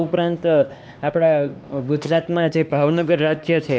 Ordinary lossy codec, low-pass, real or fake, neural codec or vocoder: none; none; fake; codec, 16 kHz, 2 kbps, X-Codec, HuBERT features, trained on LibriSpeech